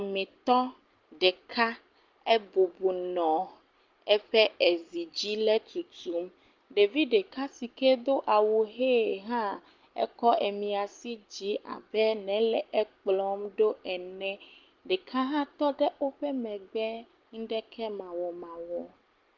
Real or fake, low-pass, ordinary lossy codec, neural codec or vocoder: real; 7.2 kHz; Opus, 32 kbps; none